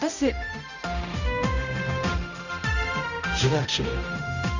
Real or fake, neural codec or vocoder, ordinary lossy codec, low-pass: fake; codec, 16 kHz, 0.5 kbps, X-Codec, HuBERT features, trained on balanced general audio; none; 7.2 kHz